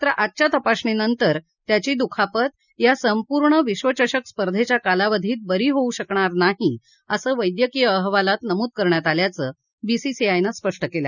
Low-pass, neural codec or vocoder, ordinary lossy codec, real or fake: 7.2 kHz; none; none; real